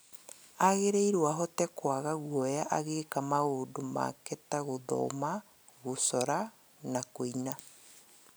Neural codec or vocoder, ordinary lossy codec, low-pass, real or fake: none; none; none; real